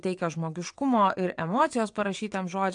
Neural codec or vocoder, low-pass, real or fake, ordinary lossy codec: vocoder, 22.05 kHz, 80 mel bands, WaveNeXt; 9.9 kHz; fake; AAC, 64 kbps